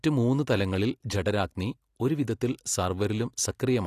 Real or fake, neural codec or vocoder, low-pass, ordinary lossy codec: real; none; 14.4 kHz; AAC, 48 kbps